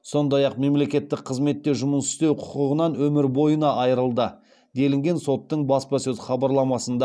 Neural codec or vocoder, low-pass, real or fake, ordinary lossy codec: none; none; real; none